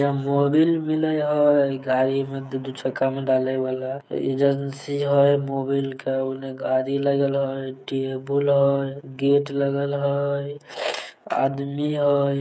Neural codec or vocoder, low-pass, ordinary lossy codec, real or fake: codec, 16 kHz, 8 kbps, FreqCodec, smaller model; none; none; fake